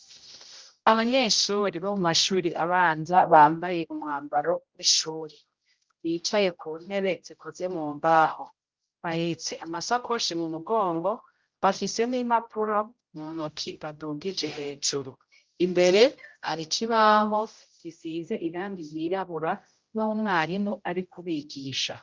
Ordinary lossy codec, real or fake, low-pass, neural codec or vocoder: Opus, 32 kbps; fake; 7.2 kHz; codec, 16 kHz, 0.5 kbps, X-Codec, HuBERT features, trained on general audio